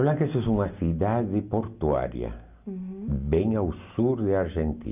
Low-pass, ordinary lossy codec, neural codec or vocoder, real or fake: 3.6 kHz; none; none; real